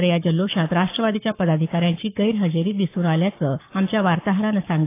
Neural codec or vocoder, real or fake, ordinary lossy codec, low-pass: codec, 44.1 kHz, 7.8 kbps, Pupu-Codec; fake; AAC, 24 kbps; 3.6 kHz